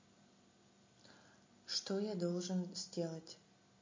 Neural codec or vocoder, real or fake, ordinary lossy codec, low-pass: none; real; MP3, 32 kbps; 7.2 kHz